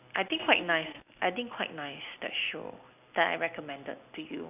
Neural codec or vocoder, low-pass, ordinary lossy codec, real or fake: none; 3.6 kHz; none; real